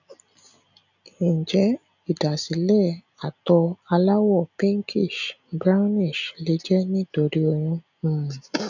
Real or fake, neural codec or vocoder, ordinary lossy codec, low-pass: real; none; none; 7.2 kHz